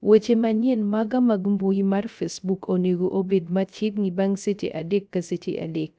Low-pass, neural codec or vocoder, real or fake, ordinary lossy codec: none; codec, 16 kHz, 0.3 kbps, FocalCodec; fake; none